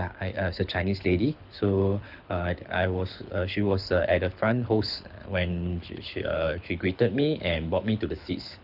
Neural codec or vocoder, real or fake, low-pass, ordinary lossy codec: codec, 24 kHz, 6 kbps, HILCodec; fake; 5.4 kHz; none